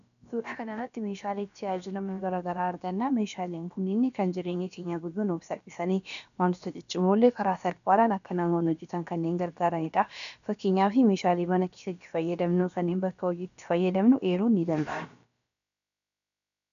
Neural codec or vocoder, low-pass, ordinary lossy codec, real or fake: codec, 16 kHz, about 1 kbps, DyCAST, with the encoder's durations; 7.2 kHz; MP3, 96 kbps; fake